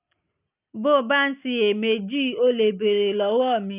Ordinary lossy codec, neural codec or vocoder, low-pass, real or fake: none; none; 3.6 kHz; real